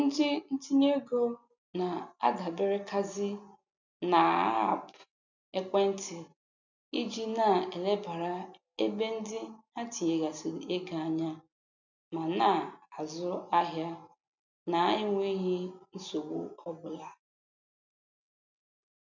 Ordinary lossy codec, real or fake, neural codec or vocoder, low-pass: none; real; none; 7.2 kHz